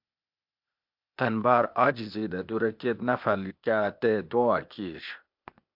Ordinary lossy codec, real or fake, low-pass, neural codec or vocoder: MP3, 48 kbps; fake; 5.4 kHz; codec, 16 kHz, 0.8 kbps, ZipCodec